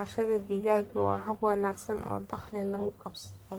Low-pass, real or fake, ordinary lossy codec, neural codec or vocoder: none; fake; none; codec, 44.1 kHz, 1.7 kbps, Pupu-Codec